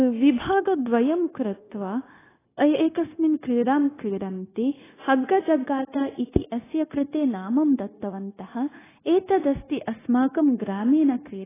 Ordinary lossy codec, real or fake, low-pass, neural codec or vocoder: AAC, 16 kbps; fake; 3.6 kHz; codec, 16 kHz, 0.9 kbps, LongCat-Audio-Codec